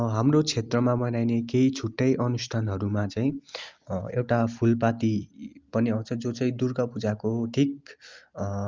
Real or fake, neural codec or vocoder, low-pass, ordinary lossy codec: real; none; 7.2 kHz; Opus, 32 kbps